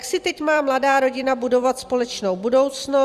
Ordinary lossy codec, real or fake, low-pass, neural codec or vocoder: Opus, 64 kbps; fake; 14.4 kHz; vocoder, 44.1 kHz, 128 mel bands every 256 samples, BigVGAN v2